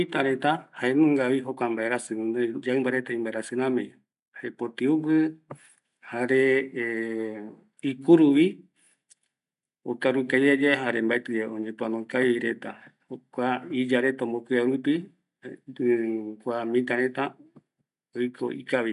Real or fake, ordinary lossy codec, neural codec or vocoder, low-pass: real; none; none; 10.8 kHz